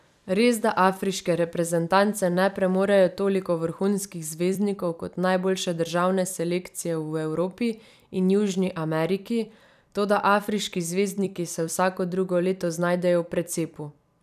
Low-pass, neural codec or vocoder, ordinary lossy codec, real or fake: 14.4 kHz; vocoder, 44.1 kHz, 128 mel bands every 256 samples, BigVGAN v2; none; fake